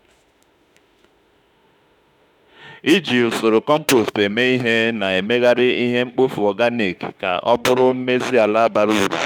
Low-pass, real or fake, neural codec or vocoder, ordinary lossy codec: 19.8 kHz; fake; autoencoder, 48 kHz, 32 numbers a frame, DAC-VAE, trained on Japanese speech; none